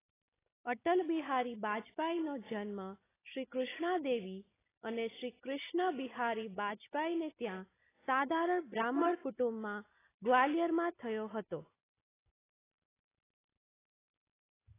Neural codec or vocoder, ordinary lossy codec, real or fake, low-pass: none; AAC, 16 kbps; real; 3.6 kHz